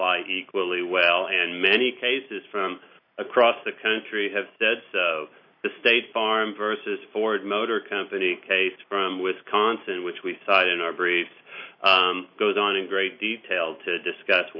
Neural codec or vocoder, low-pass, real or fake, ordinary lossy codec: none; 5.4 kHz; real; MP3, 32 kbps